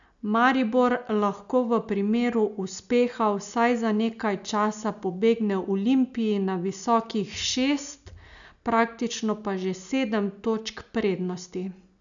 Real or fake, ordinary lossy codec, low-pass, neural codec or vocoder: real; none; 7.2 kHz; none